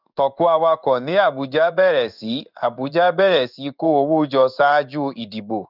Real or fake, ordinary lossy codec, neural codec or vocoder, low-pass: fake; none; codec, 16 kHz in and 24 kHz out, 1 kbps, XY-Tokenizer; 5.4 kHz